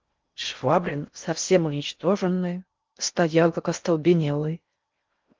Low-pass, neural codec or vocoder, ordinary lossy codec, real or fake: 7.2 kHz; codec, 16 kHz in and 24 kHz out, 0.6 kbps, FocalCodec, streaming, 4096 codes; Opus, 32 kbps; fake